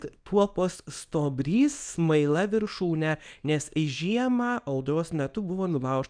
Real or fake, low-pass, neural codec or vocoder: fake; 9.9 kHz; codec, 24 kHz, 0.9 kbps, WavTokenizer, small release